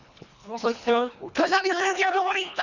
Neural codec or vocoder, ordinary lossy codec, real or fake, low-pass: codec, 24 kHz, 1.5 kbps, HILCodec; none; fake; 7.2 kHz